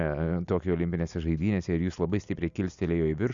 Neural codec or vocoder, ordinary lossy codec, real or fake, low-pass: none; Opus, 64 kbps; real; 7.2 kHz